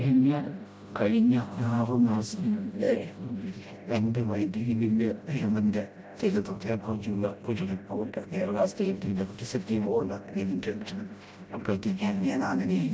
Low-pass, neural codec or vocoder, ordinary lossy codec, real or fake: none; codec, 16 kHz, 0.5 kbps, FreqCodec, smaller model; none; fake